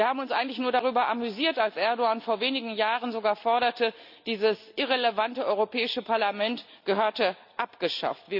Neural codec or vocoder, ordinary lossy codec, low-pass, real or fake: none; none; 5.4 kHz; real